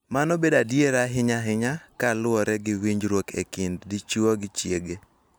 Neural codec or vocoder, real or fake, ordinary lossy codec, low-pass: none; real; none; none